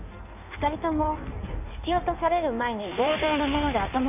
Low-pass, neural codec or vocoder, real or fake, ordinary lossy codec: 3.6 kHz; codec, 16 kHz in and 24 kHz out, 1.1 kbps, FireRedTTS-2 codec; fake; none